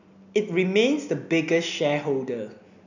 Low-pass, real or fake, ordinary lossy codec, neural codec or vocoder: 7.2 kHz; real; none; none